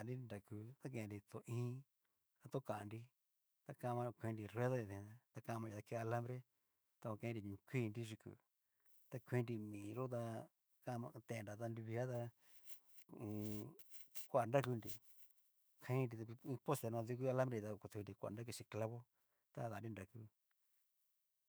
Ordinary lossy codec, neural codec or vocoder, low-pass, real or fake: none; autoencoder, 48 kHz, 128 numbers a frame, DAC-VAE, trained on Japanese speech; none; fake